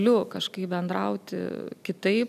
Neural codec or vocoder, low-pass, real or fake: none; 14.4 kHz; real